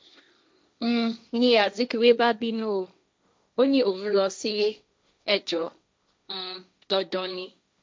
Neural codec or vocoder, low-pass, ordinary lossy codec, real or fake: codec, 16 kHz, 1.1 kbps, Voila-Tokenizer; none; none; fake